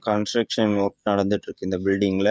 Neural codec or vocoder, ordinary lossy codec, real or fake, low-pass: codec, 16 kHz, 16 kbps, FreqCodec, smaller model; none; fake; none